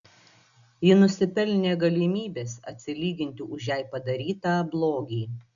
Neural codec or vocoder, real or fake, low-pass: none; real; 7.2 kHz